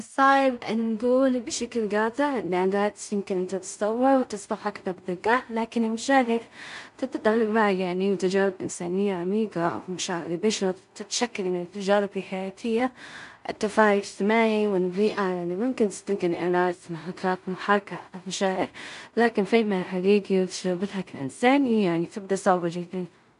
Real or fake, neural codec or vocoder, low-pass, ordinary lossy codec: fake; codec, 16 kHz in and 24 kHz out, 0.4 kbps, LongCat-Audio-Codec, two codebook decoder; 10.8 kHz; AAC, 96 kbps